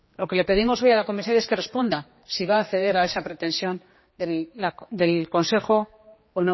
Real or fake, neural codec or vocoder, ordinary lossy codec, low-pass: fake; codec, 16 kHz, 2 kbps, X-Codec, HuBERT features, trained on general audio; MP3, 24 kbps; 7.2 kHz